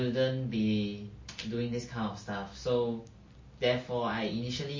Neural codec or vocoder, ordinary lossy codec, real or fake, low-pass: none; MP3, 32 kbps; real; 7.2 kHz